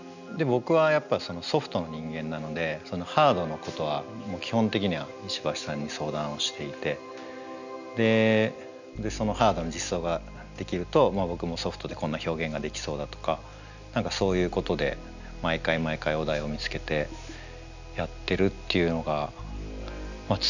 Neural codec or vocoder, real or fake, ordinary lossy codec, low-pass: none; real; none; 7.2 kHz